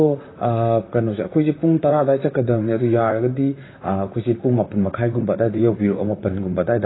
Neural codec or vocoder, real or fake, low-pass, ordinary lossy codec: vocoder, 44.1 kHz, 128 mel bands, Pupu-Vocoder; fake; 7.2 kHz; AAC, 16 kbps